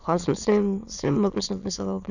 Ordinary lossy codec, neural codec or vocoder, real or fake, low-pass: none; autoencoder, 22.05 kHz, a latent of 192 numbers a frame, VITS, trained on many speakers; fake; 7.2 kHz